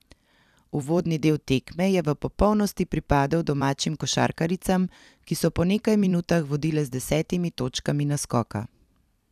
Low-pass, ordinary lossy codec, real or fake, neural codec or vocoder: 14.4 kHz; none; fake; vocoder, 44.1 kHz, 128 mel bands every 256 samples, BigVGAN v2